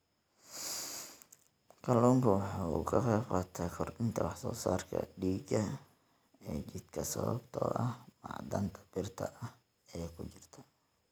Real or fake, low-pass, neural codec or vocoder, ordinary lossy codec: real; none; none; none